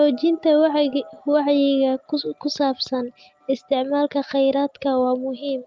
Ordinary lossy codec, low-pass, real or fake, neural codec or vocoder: Opus, 32 kbps; 7.2 kHz; real; none